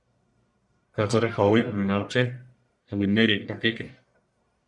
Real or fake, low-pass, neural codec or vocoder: fake; 10.8 kHz; codec, 44.1 kHz, 1.7 kbps, Pupu-Codec